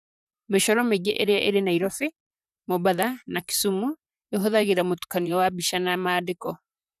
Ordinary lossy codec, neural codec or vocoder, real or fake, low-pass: none; vocoder, 44.1 kHz, 128 mel bands, Pupu-Vocoder; fake; 14.4 kHz